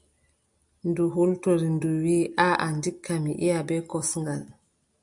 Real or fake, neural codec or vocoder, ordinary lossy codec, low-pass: real; none; MP3, 64 kbps; 10.8 kHz